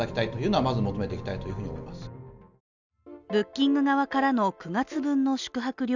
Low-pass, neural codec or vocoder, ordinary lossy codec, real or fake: 7.2 kHz; none; none; real